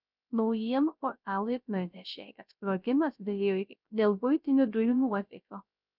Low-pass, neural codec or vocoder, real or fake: 5.4 kHz; codec, 16 kHz, 0.3 kbps, FocalCodec; fake